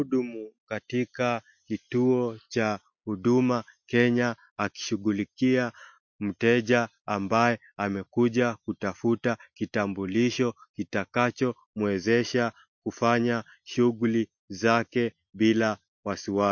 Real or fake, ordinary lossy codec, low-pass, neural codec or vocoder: real; MP3, 48 kbps; 7.2 kHz; none